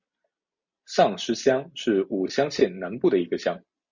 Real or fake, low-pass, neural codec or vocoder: real; 7.2 kHz; none